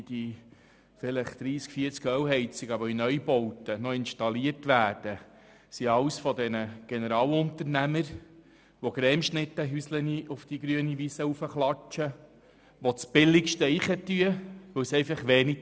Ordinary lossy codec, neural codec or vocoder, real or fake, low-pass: none; none; real; none